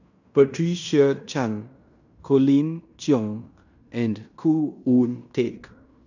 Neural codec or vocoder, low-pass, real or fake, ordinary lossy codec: codec, 16 kHz in and 24 kHz out, 0.9 kbps, LongCat-Audio-Codec, fine tuned four codebook decoder; 7.2 kHz; fake; none